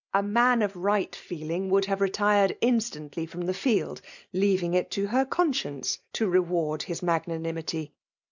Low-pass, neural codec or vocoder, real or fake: 7.2 kHz; none; real